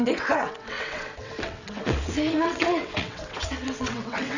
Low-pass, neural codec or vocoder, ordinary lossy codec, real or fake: 7.2 kHz; vocoder, 22.05 kHz, 80 mel bands, WaveNeXt; none; fake